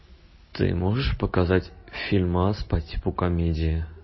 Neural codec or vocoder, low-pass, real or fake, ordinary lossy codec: none; 7.2 kHz; real; MP3, 24 kbps